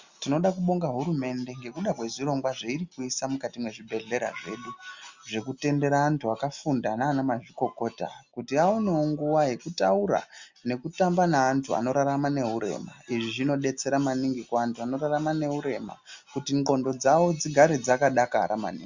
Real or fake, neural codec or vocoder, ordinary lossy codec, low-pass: real; none; Opus, 64 kbps; 7.2 kHz